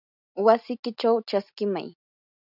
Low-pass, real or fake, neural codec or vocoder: 5.4 kHz; real; none